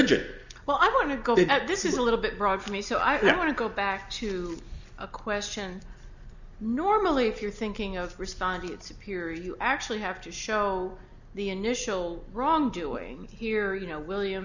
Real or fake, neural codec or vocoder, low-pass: real; none; 7.2 kHz